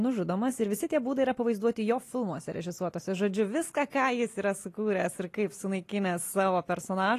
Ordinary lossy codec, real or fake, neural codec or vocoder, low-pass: AAC, 48 kbps; real; none; 14.4 kHz